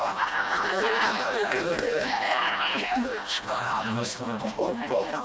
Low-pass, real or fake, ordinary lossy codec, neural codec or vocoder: none; fake; none; codec, 16 kHz, 1 kbps, FreqCodec, smaller model